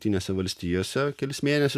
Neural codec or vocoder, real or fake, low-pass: none; real; 14.4 kHz